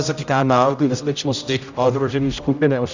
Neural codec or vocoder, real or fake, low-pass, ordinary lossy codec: codec, 16 kHz, 0.5 kbps, X-Codec, HuBERT features, trained on general audio; fake; 7.2 kHz; Opus, 64 kbps